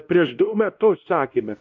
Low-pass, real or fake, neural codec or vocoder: 7.2 kHz; fake; codec, 16 kHz, 1 kbps, X-Codec, WavLM features, trained on Multilingual LibriSpeech